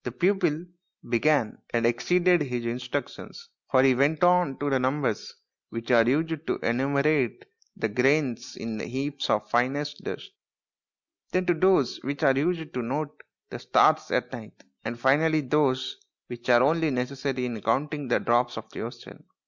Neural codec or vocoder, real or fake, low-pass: none; real; 7.2 kHz